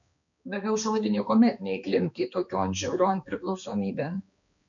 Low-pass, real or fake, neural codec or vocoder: 7.2 kHz; fake; codec, 16 kHz, 2 kbps, X-Codec, HuBERT features, trained on balanced general audio